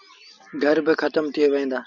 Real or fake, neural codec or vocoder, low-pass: real; none; 7.2 kHz